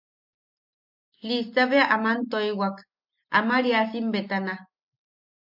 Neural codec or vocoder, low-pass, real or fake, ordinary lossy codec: none; 5.4 kHz; real; MP3, 48 kbps